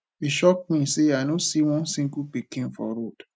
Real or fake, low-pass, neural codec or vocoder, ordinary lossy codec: real; none; none; none